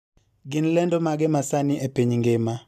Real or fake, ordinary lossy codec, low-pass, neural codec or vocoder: real; none; 14.4 kHz; none